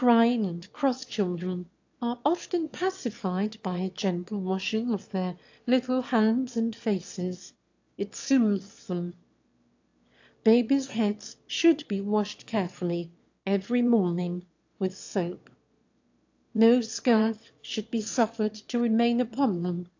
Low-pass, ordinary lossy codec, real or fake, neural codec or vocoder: 7.2 kHz; AAC, 48 kbps; fake; autoencoder, 22.05 kHz, a latent of 192 numbers a frame, VITS, trained on one speaker